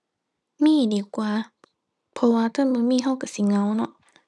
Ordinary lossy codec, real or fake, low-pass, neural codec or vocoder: none; real; none; none